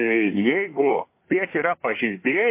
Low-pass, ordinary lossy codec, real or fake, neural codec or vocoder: 3.6 kHz; MP3, 24 kbps; fake; codec, 24 kHz, 1 kbps, SNAC